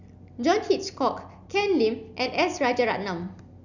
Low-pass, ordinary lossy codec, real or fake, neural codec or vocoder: 7.2 kHz; none; real; none